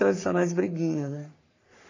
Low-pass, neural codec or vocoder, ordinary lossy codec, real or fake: 7.2 kHz; codec, 44.1 kHz, 3.4 kbps, Pupu-Codec; AAC, 32 kbps; fake